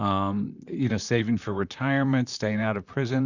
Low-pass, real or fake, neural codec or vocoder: 7.2 kHz; fake; vocoder, 44.1 kHz, 128 mel bands, Pupu-Vocoder